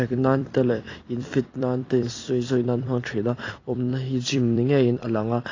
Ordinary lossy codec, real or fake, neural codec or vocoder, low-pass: AAC, 32 kbps; fake; vocoder, 44.1 kHz, 128 mel bands every 256 samples, BigVGAN v2; 7.2 kHz